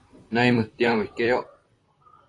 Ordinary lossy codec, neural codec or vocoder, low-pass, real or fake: AAC, 32 kbps; vocoder, 44.1 kHz, 128 mel bands, Pupu-Vocoder; 10.8 kHz; fake